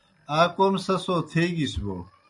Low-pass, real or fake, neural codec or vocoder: 10.8 kHz; real; none